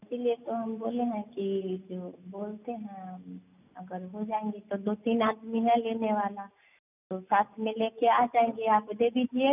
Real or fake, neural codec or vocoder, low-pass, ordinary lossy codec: real; none; 3.6 kHz; none